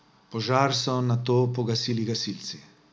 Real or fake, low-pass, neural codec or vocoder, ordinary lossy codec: real; none; none; none